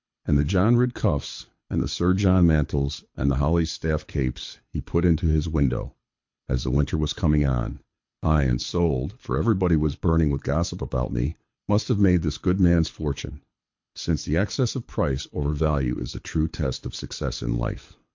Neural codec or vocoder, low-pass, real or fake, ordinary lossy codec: codec, 24 kHz, 6 kbps, HILCodec; 7.2 kHz; fake; MP3, 48 kbps